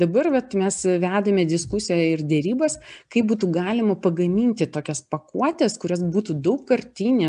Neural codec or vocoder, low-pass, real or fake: none; 9.9 kHz; real